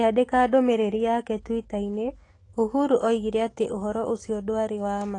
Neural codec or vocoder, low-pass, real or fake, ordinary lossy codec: autoencoder, 48 kHz, 128 numbers a frame, DAC-VAE, trained on Japanese speech; 10.8 kHz; fake; AAC, 32 kbps